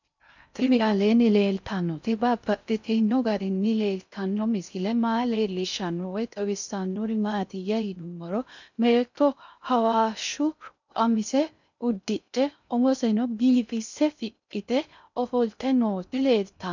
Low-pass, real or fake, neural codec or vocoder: 7.2 kHz; fake; codec, 16 kHz in and 24 kHz out, 0.6 kbps, FocalCodec, streaming, 2048 codes